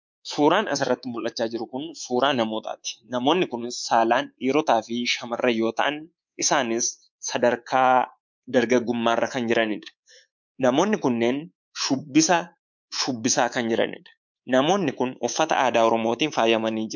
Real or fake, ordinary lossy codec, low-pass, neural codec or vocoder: fake; MP3, 64 kbps; 7.2 kHz; codec, 24 kHz, 3.1 kbps, DualCodec